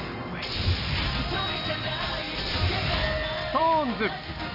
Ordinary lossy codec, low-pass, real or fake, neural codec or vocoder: none; 5.4 kHz; real; none